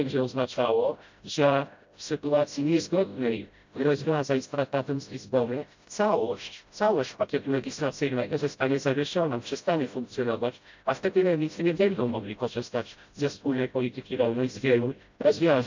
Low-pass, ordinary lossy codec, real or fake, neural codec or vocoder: 7.2 kHz; MP3, 64 kbps; fake; codec, 16 kHz, 0.5 kbps, FreqCodec, smaller model